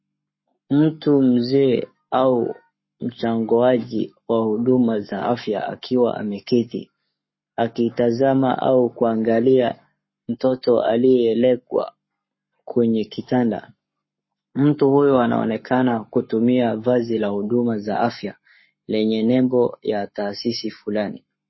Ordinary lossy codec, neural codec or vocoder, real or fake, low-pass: MP3, 24 kbps; codec, 44.1 kHz, 7.8 kbps, Pupu-Codec; fake; 7.2 kHz